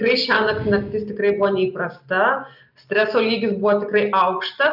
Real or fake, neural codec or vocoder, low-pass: real; none; 5.4 kHz